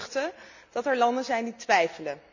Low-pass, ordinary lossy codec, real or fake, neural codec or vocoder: 7.2 kHz; none; real; none